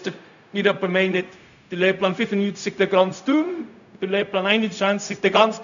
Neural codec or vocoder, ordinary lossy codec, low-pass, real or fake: codec, 16 kHz, 0.4 kbps, LongCat-Audio-Codec; none; 7.2 kHz; fake